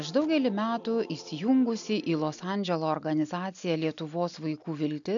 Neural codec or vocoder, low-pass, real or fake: none; 7.2 kHz; real